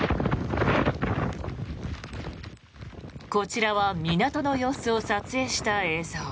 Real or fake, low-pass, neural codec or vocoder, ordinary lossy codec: real; none; none; none